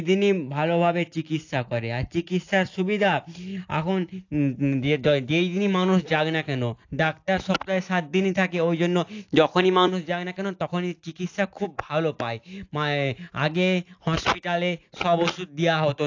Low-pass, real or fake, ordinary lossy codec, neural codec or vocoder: 7.2 kHz; real; AAC, 48 kbps; none